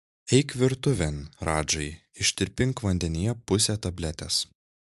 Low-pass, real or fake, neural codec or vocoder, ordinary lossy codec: 14.4 kHz; real; none; MP3, 96 kbps